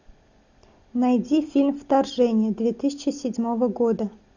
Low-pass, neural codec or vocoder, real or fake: 7.2 kHz; none; real